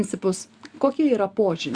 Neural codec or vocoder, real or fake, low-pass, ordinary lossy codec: none; real; 9.9 kHz; Opus, 64 kbps